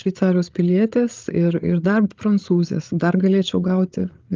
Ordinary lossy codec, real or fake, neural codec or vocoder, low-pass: Opus, 24 kbps; fake; codec, 16 kHz, 8 kbps, FreqCodec, larger model; 7.2 kHz